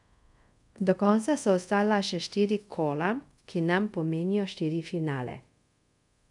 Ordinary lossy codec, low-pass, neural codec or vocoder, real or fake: none; 10.8 kHz; codec, 24 kHz, 0.5 kbps, DualCodec; fake